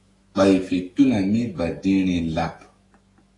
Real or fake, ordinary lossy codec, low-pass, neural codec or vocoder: fake; AAC, 32 kbps; 10.8 kHz; autoencoder, 48 kHz, 128 numbers a frame, DAC-VAE, trained on Japanese speech